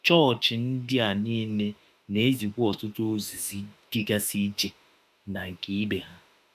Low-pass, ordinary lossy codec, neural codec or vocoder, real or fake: 14.4 kHz; AAC, 96 kbps; autoencoder, 48 kHz, 32 numbers a frame, DAC-VAE, trained on Japanese speech; fake